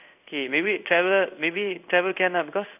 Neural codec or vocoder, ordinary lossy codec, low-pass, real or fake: codec, 16 kHz in and 24 kHz out, 1 kbps, XY-Tokenizer; none; 3.6 kHz; fake